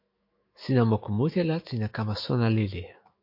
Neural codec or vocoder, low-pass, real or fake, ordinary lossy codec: autoencoder, 48 kHz, 128 numbers a frame, DAC-VAE, trained on Japanese speech; 5.4 kHz; fake; MP3, 32 kbps